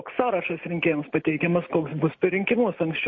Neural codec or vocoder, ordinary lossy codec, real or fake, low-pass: none; MP3, 32 kbps; real; 7.2 kHz